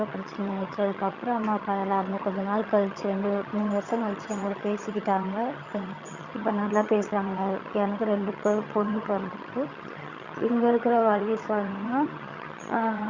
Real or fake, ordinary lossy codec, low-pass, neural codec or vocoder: fake; Opus, 64 kbps; 7.2 kHz; vocoder, 22.05 kHz, 80 mel bands, HiFi-GAN